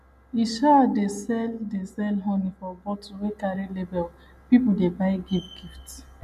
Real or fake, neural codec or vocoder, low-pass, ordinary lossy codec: real; none; 14.4 kHz; none